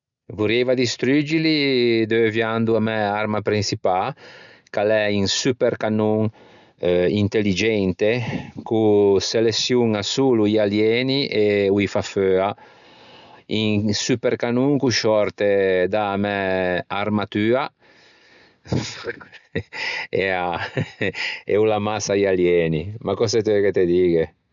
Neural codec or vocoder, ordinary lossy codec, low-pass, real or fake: none; none; 7.2 kHz; real